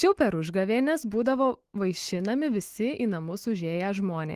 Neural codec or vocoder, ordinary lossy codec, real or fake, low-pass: autoencoder, 48 kHz, 128 numbers a frame, DAC-VAE, trained on Japanese speech; Opus, 16 kbps; fake; 14.4 kHz